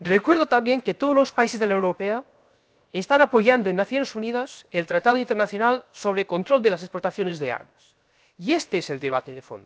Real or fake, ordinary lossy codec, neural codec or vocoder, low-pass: fake; none; codec, 16 kHz, 0.7 kbps, FocalCodec; none